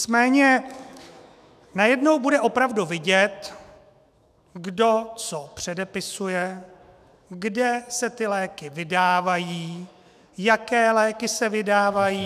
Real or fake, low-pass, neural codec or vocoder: fake; 14.4 kHz; autoencoder, 48 kHz, 128 numbers a frame, DAC-VAE, trained on Japanese speech